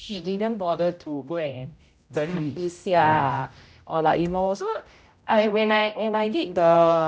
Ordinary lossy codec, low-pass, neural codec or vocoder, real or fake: none; none; codec, 16 kHz, 0.5 kbps, X-Codec, HuBERT features, trained on general audio; fake